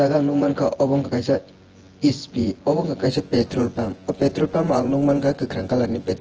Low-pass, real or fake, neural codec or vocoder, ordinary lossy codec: 7.2 kHz; fake; vocoder, 24 kHz, 100 mel bands, Vocos; Opus, 16 kbps